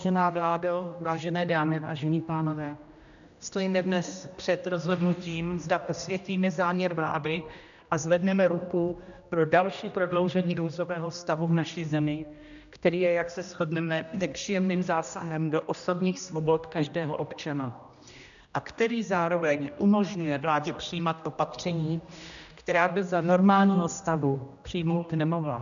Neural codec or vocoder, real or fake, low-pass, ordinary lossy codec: codec, 16 kHz, 1 kbps, X-Codec, HuBERT features, trained on general audio; fake; 7.2 kHz; MP3, 96 kbps